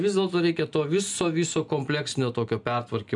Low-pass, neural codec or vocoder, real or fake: 10.8 kHz; none; real